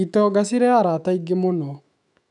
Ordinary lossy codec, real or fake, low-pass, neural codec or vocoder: none; fake; 10.8 kHz; autoencoder, 48 kHz, 128 numbers a frame, DAC-VAE, trained on Japanese speech